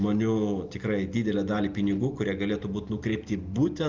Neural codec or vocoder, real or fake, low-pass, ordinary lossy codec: none; real; 7.2 kHz; Opus, 24 kbps